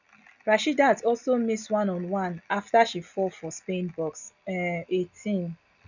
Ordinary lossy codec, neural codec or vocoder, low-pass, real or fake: none; none; 7.2 kHz; real